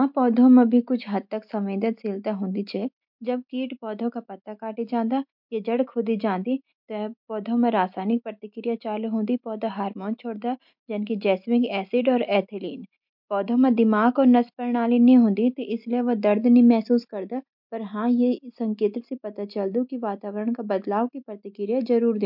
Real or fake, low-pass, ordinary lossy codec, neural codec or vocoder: real; 5.4 kHz; MP3, 48 kbps; none